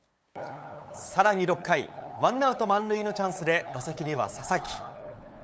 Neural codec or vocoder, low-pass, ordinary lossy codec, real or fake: codec, 16 kHz, 8 kbps, FunCodec, trained on LibriTTS, 25 frames a second; none; none; fake